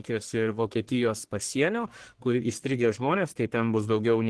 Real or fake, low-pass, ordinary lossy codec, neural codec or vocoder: fake; 10.8 kHz; Opus, 16 kbps; codec, 44.1 kHz, 1.7 kbps, Pupu-Codec